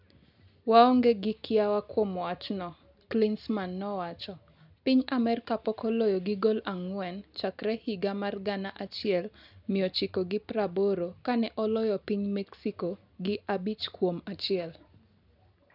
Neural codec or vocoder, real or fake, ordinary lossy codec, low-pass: none; real; none; 5.4 kHz